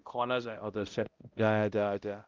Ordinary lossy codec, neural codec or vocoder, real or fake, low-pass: Opus, 32 kbps; codec, 16 kHz, 0.5 kbps, X-Codec, HuBERT features, trained on balanced general audio; fake; 7.2 kHz